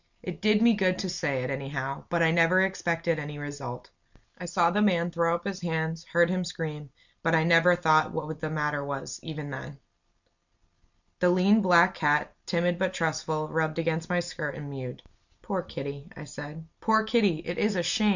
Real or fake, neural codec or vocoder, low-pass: real; none; 7.2 kHz